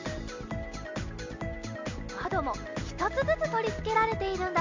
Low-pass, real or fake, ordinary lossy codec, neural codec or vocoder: 7.2 kHz; real; MP3, 64 kbps; none